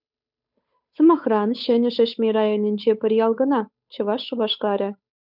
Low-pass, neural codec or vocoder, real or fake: 5.4 kHz; codec, 16 kHz, 8 kbps, FunCodec, trained on Chinese and English, 25 frames a second; fake